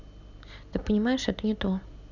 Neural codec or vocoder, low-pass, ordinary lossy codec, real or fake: none; 7.2 kHz; none; real